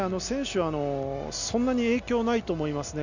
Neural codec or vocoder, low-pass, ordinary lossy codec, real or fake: none; 7.2 kHz; none; real